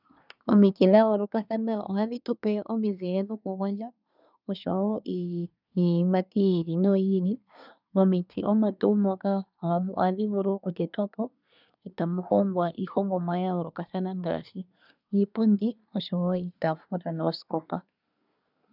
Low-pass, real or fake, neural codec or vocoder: 5.4 kHz; fake; codec, 24 kHz, 1 kbps, SNAC